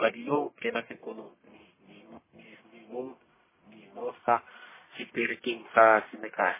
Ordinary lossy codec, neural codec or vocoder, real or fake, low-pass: MP3, 16 kbps; codec, 44.1 kHz, 1.7 kbps, Pupu-Codec; fake; 3.6 kHz